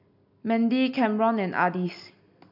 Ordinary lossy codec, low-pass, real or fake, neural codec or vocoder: MP3, 48 kbps; 5.4 kHz; real; none